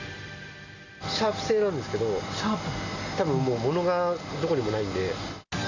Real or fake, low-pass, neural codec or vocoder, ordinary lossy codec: real; 7.2 kHz; none; none